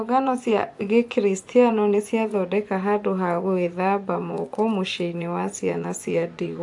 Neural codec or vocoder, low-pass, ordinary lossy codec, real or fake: vocoder, 24 kHz, 100 mel bands, Vocos; 10.8 kHz; AAC, 64 kbps; fake